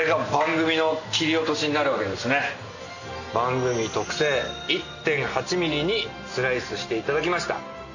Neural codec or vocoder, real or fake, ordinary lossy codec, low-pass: none; real; none; 7.2 kHz